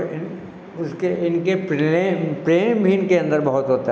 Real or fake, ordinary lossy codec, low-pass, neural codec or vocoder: real; none; none; none